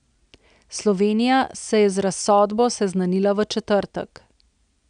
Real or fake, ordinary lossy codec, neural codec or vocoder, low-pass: real; none; none; 9.9 kHz